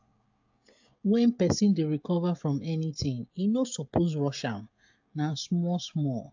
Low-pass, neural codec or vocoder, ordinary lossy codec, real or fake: 7.2 kHz; codec, 16 kHz, 16 kbps, FreqCodec, smaller model; AAC, 48 kbps; fake